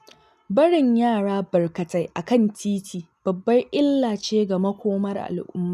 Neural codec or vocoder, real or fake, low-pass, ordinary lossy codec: none; real; 14.4 kHz; none